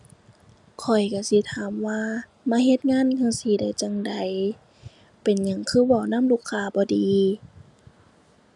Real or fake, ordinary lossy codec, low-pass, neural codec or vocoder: real; none; 10.8 kHz; none